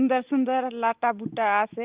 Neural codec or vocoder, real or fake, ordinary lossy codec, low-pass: none; real; Opus, 32 kbps; 3.6 kHz